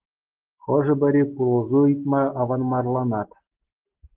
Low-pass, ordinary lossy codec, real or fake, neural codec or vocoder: 3.6 kHz; Opus, 16 kbps; real; none